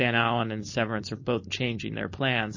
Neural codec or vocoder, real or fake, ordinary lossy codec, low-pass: codec, 16 kHz, 4.8 kbps, FACodec; fake; MP3, 32 kbps; 7.2 kHz